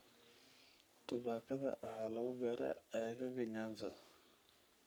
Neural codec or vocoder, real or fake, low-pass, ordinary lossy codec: codec, 44.1 kHz, 3.4 kbps, Pupu-Codec; fake; none; none